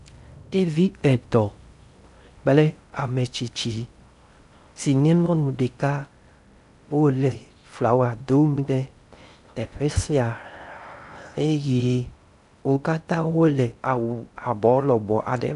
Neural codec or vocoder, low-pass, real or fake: codec, 16 kHz in and 24 kHz out, 0.6 kbps, FocalCodec, streaming, 2048 codes; 10.8 kHz; fake